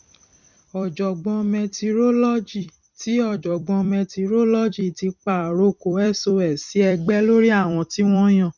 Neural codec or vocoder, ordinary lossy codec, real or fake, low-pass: vocoder, 44.1 kHz, 128 mel bands every 256 samples, BigVGAN v2; none; fake; 7.2 kHz